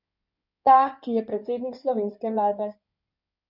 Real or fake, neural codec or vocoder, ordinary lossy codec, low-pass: fake; codec, 16 kHz in and 24 kHz out, 2.2 kbps, FireRedTTS-2 codec; none; 5.4 kHz